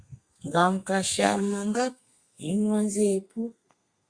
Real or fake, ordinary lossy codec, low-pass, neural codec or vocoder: fake; Opus, 64 kbps; 9.9 kHz; codec, 32 kHz, 1.9 kbps, SNAC